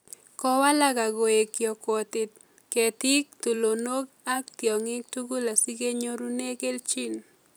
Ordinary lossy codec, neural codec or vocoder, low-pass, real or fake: none; vocoder, 44.1 kHz, 128 mel bands every 256 samples, BigVGAN v2; none; fake